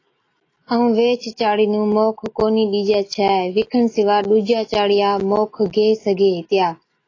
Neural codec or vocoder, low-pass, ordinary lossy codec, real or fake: none; 7.2 kHz; AAC, 32 kbps; real